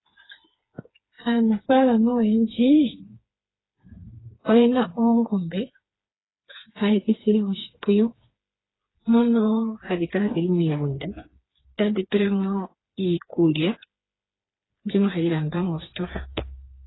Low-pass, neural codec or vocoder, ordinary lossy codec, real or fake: 7.2 kHz; codec, 16 kHz, 4 kbps, FreqCodec, smaller model; AAC, 16 kbps; fake